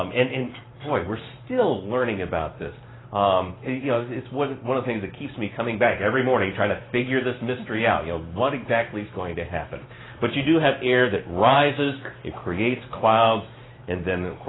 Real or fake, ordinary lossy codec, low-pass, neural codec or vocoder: fake; AAC, 16 kbps; 7.2 kHz; vocoder, 44.1 kHz, 128 mel bands every 512 samples, BigVGAN v2